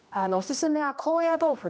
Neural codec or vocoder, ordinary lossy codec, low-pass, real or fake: codec, 16 kHz, 1 kbps, X-Codec, HuBERT features, trained on general audio; none; none; fake